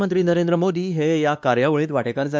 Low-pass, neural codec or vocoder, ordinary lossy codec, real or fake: none; codec, 16 kHz, 2 kbps, X-Codec, WavLM features, trained on Multilingual LibriSpeech; none; fake